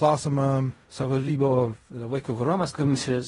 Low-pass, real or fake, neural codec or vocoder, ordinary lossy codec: 10.8 kHz; fake; codec, 16 kHz in and 24 kHz out, 0.4 kbps, LongCat-Audio-Codec, fine tuned four codebook decoder; AAC, 32 kbps